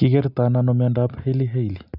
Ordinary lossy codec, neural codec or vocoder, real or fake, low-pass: none; none; real; 5.4 kHz